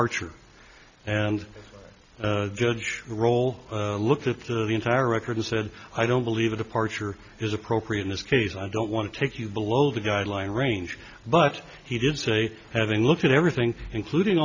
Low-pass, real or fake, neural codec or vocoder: 7.2 kHz; real; none